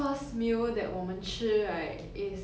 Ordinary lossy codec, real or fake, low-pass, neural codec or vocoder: none; real; none; none